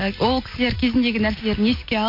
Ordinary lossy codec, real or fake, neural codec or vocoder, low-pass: MP3, 32 kbps; real; none; 5.4 kHz